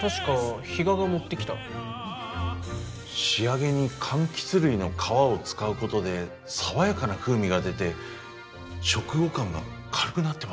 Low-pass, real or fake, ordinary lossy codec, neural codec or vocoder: none; real; none; none